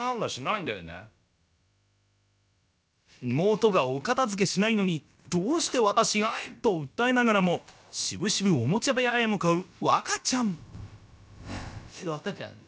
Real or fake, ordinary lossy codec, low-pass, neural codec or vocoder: fake; none; none; codec, 16 kHz, about 1 kbps, DyCAST, with the encoder's durations